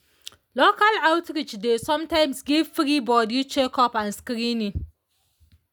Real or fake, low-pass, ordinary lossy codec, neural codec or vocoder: real; none; none; none